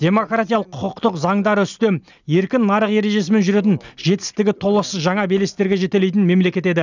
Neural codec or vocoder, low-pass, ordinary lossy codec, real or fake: none; 7.2 kHz; none; real